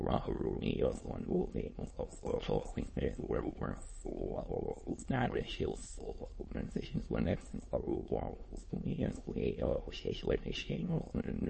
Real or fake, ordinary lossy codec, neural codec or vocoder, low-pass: fake; MP3, 32 kbps; autoencoder, 22.05 kHz, a latent of 192 numbers a frame, VITS, trained on many speakers; 9.9 kHz